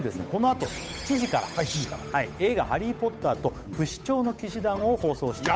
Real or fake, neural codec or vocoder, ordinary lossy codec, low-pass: fake; codec, 16 kHz, 8 kbps, FunCodec, trained on Chinese and English, 25 frames a second; none; none